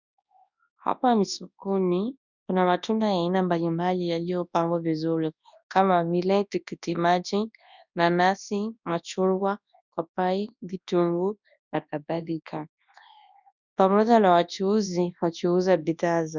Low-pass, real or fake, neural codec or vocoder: 7.2 kHz; fake; codec, 24 kHz, 0.9 kbps, WavTokenizer, large speech release